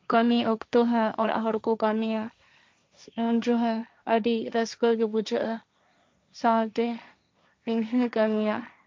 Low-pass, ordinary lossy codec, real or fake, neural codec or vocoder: none; none; fake; codec, 16 kHz, 1.1 kbps, Voila-Tokenizer